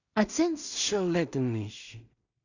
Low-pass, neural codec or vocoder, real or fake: 7.2 kHz; codec, 16 kHz in and 24 kHz out, 0.4 kbps, LongCat-Audio-Codec, two codebook decoder; fake